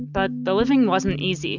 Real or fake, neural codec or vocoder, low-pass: real; none; 7.2 kHz